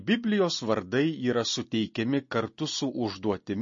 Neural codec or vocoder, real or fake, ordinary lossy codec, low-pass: none; real; MP3, 32 kbps; 7.2 kHz